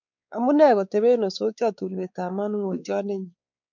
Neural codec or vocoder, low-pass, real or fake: codec, 16 kHz, 4 kbps, X-Codec, WavLM features, trained on Multilingual LibriSpeech; 7.2 kHz; fake